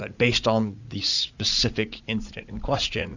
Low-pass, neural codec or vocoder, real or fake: 7.2 kHz; vocoder, 44.1 kHz, 80 mel bands, Vocos; fake